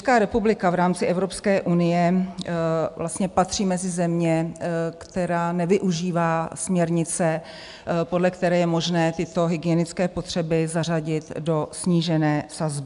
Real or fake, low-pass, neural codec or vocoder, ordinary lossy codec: real; 10.8 kHz; none; AAC, 96 kbps